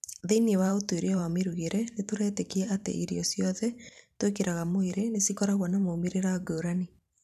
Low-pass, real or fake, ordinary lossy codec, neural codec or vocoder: 14.4 kHz; fake; none; vocoder, 44.1 kHz, 128 mel bands every 512 samples, BigVGAN v2